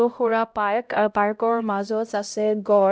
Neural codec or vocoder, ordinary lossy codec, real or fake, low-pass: codec, 16 kHz, 0.5 kbps, X-Codec, HuBERT features, trained on LibriSpeech; none; fake; none